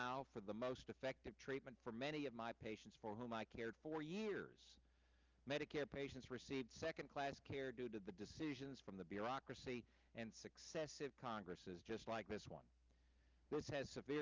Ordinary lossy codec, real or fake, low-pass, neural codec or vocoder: Opus, 24 kbps; real; 7.2 kHz; none